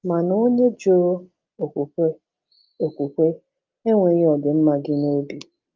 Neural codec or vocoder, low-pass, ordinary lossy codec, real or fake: none; 7.2 kHz; Opus, 32 kbps; real